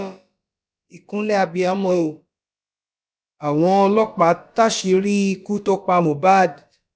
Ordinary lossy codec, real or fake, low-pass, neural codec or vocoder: none; fake; none; codec, 16 kHz, about 1 kbps, DyCAST, with the encoder's durations